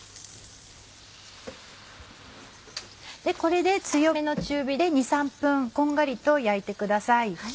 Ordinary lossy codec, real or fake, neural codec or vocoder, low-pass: none; real; none; none